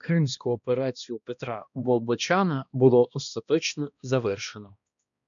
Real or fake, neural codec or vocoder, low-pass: fake; codec, 16 kHz, 1 kbps, X-Codec, HuBERT features, trained on balanced general audio; 7.2 kHz